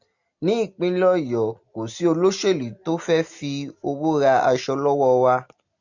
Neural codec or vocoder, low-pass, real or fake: none; 7.2 kHz; real